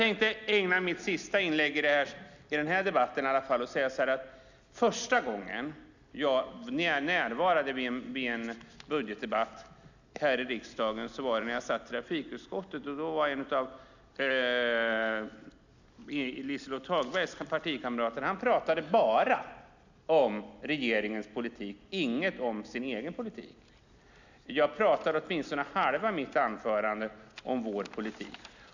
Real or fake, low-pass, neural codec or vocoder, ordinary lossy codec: real; 7.2 kHz; none; none